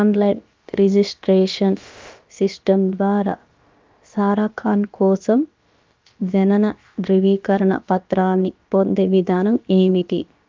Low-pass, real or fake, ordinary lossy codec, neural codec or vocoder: 7.2 kHz; fake; Opus, 24 kbps; codec, 16 kHz, about 1 kbps, DyCAST, with the encoder's durations